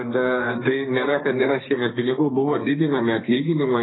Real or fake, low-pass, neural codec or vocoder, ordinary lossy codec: fake; 7.2 kHz; codec, 32 kHz, 1.9 kbps, SNAC; AAC, 16 kbps